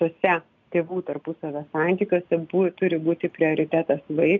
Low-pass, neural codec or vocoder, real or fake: 7.2 kHz; none; real